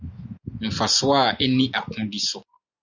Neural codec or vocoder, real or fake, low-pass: none; real; 7.2 kHz